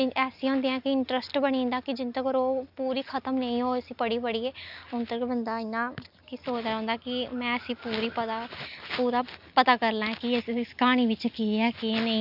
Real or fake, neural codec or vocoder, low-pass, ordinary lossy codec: real; none; 5.4 kHz; none